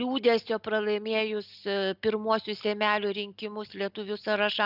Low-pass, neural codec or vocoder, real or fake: 5.4 kHz; none; real